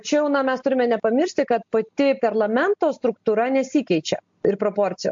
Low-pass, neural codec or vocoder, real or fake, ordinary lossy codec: 7.2 kHz; none; real; MP3, 48 kbps